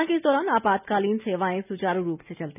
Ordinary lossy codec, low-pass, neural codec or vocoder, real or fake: none; 3.6 kHz; none; real